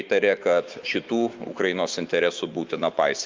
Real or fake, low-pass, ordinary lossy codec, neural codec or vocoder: fake; 7.2 kHz; Opus, 24 kbps; autoencoder, 48 kHz, 128 numbers a frame, DAC-VAE, trained on Japanese speech